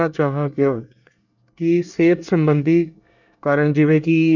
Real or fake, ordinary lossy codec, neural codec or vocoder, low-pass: fake; none; codec, 24 kHz, 1 kbps, SNAC; 7.2 kHz